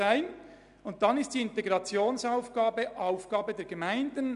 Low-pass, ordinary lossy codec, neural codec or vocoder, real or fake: 10.8 kHz; none; none; real